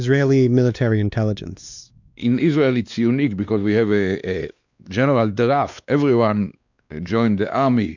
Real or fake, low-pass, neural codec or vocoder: fake; 7.2 kHz; codec, 16 kHz, 2 kbps, X-Codec, WavLM features, trained on Multilingual LibriSpeech